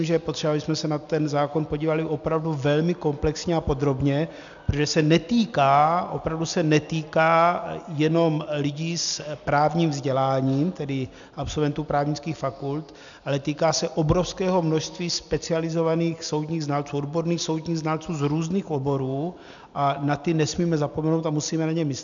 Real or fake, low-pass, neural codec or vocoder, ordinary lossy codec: real; 7.2 kHz; none; MP3, 96 kbps